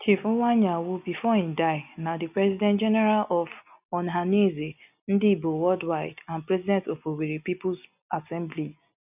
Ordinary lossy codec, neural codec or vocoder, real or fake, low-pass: none; none; real; 3.6 kHz